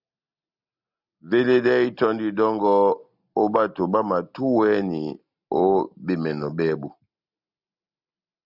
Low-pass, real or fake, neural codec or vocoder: 5.4 kHz; real; none